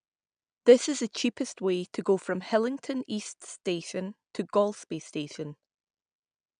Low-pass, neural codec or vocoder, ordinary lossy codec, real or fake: 9.9 kHz; none; none; real